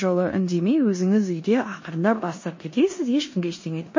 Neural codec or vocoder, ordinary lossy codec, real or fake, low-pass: codec, 16 kHz in and 24 kHz out, 0.9 kbps, LongCat-Audio-Codec, four codebook decoder; MP3, 32 kbps; fake; 7.2 kHz